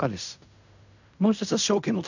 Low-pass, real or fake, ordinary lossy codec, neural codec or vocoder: 7.2 kHz; fake; none; codec, 16 kHz in and 24 kHz out, 0.4 kbps, LongCat-Audio-Codec, fine tuned four codebook decoder